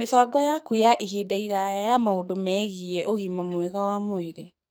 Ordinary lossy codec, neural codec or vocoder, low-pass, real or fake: none; codec, 44.1 kHz, 2.6 kbps, SNAC; none; fake